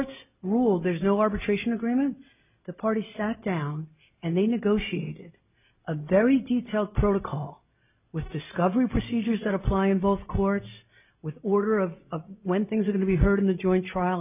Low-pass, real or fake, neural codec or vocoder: 3.6 kHz; real; none